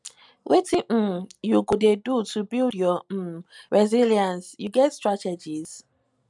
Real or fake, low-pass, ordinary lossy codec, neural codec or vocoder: real; 10.8 kHz; MP3, 96 kbps; none